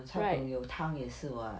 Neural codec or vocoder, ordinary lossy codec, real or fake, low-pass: none; none; real; none